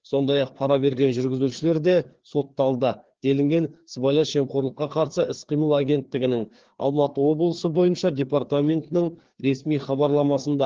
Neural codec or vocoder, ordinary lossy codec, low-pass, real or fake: codec, 16 kHz, 2 kbps, FreqCodec, larger model; Opus, 16 kbps; 7.2 kHz; fake